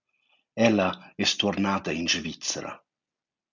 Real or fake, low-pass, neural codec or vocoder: real; 7.2 kHz; none